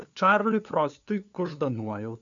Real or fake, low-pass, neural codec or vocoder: fake; 7.2 kHz; codec, 16 kHz, 2 kbps, FunCodec, trained on LibriTTS, 25 frames a second